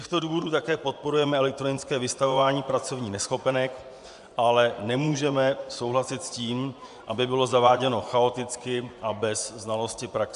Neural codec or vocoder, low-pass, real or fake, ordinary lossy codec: vocoder, 24 kHz, 100 mel bands, Vocos; 10.8 kHz; fake; AAC, 96 kbps